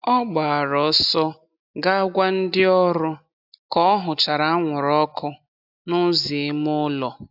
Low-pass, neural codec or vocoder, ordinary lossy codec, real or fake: 5.4 kHz; none; AAC, 48 kbps; real